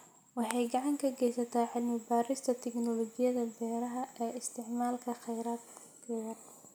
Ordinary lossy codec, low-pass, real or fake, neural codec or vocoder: none; none; real; none